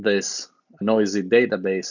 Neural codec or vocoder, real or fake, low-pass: none; real; 7.2 kHz